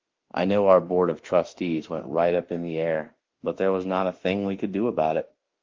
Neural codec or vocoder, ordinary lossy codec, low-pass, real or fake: autoencoder, 48 kHz, 32 numbers a frame, DAC-VAE, trained on Japanese speech; Opus, 32 kbps; 7.2 kHz; fake